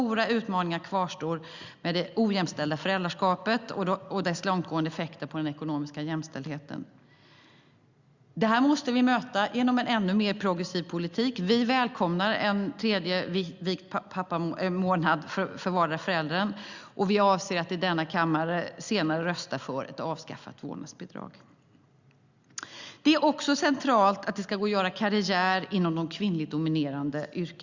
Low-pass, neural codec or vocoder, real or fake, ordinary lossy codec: 7.2 kHz; none; real; Opus, 64 kbps